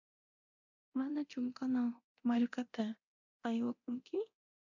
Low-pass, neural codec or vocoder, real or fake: 7.2 kHz; codec, 24 kHz, 0.9 kbps, DualCodec; fake